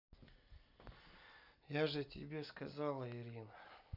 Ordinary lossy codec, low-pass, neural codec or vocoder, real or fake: none; 5.4 kHz; none; real